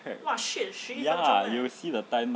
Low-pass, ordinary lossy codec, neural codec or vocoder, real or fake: none; none; none; real